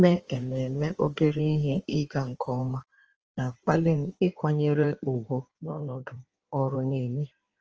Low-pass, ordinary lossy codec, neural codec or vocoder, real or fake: 7.2 kHz; Opus, 24 kbps; codec, 16 kHz in and 24 kHz out, 1.1 kbps, FireRedTTS-2 codec; fake